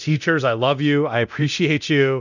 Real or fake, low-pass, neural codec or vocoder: fake; 7.2 kHz; codec, 24 kHz, 0.9 kbps, DualCodec